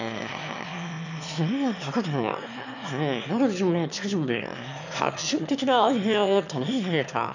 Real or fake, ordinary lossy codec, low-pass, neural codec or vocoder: fake; none; 7.2 kHz; autoencoder, 22.05 kHz, a latent of 192 numbers a frame, VITS, trained on one speaker